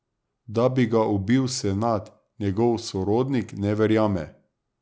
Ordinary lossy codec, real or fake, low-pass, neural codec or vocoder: none; real; none; none